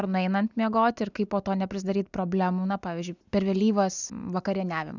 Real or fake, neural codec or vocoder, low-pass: real; none; 7.2 kHz